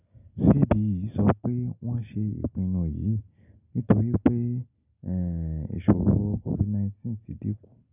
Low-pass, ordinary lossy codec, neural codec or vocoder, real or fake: 3.6 kHz; none; none; real